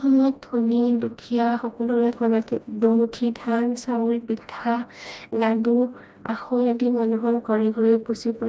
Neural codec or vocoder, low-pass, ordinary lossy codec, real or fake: codec, 16 kHz, 1 kbps, FreqCodec, smaller model; none; none; fake